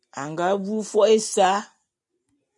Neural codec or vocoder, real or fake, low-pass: none; real; 10.8 kHz